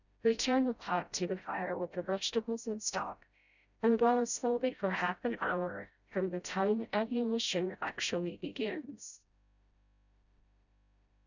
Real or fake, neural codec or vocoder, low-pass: fake; codec, 16 kHz, 0.5 kbps, FreqCodec, smaller model; 7.2 kHz